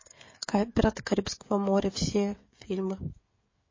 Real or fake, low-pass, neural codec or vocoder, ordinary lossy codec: fake; 7.2 kHz; codec, 16 kHz, 16 kbps, FreqCodec, smaller model; MP3, 32 kbps